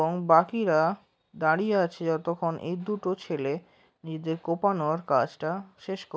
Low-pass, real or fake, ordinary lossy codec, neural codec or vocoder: none; real; none; none